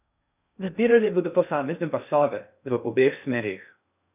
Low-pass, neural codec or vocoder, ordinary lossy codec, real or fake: 3.6 kHz; codec, 16 kHz in and 24 kHz out, 0.6 kbps, FocalCodec, streaming, 2048 codes; none; fake